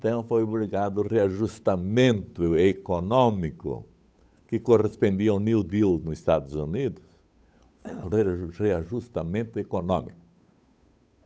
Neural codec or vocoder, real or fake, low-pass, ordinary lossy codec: codec, 16 kHz, 8 kbps, FunCodec, trained on Chinese and English, 25 frames a second; fake; none; none